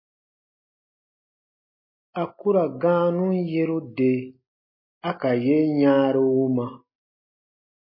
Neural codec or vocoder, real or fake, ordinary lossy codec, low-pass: none; real; MP3, 24 kbps; 5.4 kHz